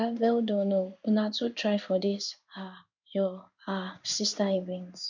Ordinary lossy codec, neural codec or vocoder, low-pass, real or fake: none; codec, 16 kHz in and 24 kHz out, 1 kbps, XY-Tokenizer; 7.2 kHz; fake